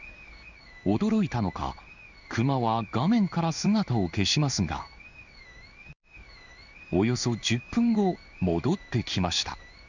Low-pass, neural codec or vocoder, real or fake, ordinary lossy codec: 7.2 kHz; codec, 16 kHz in and 24 kHz out, 1 kbps, XY-Tokenizer; fake; none